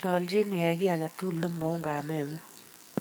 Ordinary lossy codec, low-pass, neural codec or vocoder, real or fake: none; none; codec, 44.1 kHz, 2.6 kbps, SNAC; fake